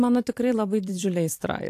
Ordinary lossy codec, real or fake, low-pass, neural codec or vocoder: AAC, 64 kbps; fake; 14.4 kHz; vocoder, 44.1 kHz, 128 mel bands every 256 samples, BigVGAN v2